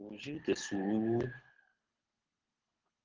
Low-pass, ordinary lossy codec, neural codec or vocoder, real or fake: 7.2 kHz; Opus, 16 kbps; codec, 24 kHz, 6 kbps, HILCodec; fake